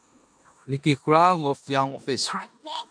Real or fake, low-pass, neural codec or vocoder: fake; 9.9 kHz; codec, 16 kHz in and 24 kHz out, 0.9 kbps, LongCat-Audio-Codec, four codebook decoder